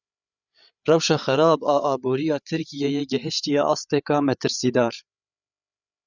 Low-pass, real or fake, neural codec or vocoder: 7.2 kHz; fake; codec, 16 kHz, 8 kbps, FreqCodec, larger model